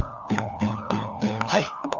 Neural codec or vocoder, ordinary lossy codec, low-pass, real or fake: codec, 16 kHz, 4 kbps, FunCodec, trained on LibriTTS, 50 frames a second; none; 7.2 kHz; fake